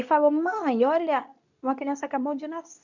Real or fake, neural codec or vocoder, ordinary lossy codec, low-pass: fake; codec, 24 kHz, 0.9 kbps, WavTokenizer, medium speech release version 2; none; 7.2 kHz